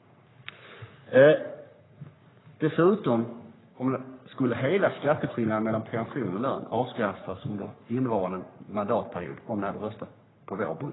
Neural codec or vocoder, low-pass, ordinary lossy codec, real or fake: codec, 44.1 kHz, 3.4 kbps, Pupu-Codec; 7.2 kHz; AAC, 16 kbps; fake